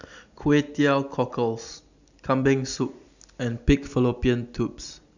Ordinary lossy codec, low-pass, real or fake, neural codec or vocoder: none; 7.2 kHz; real; none